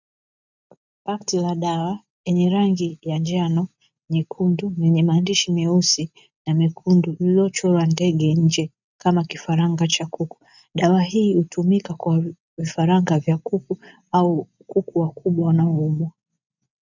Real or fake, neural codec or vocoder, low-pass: real; none; 7.2 kHz